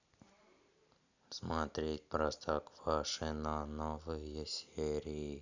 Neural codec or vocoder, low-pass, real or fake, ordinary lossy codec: none; 7.2 kHz; real; none